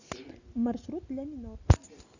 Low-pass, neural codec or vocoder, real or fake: 7.2 kHz; none; real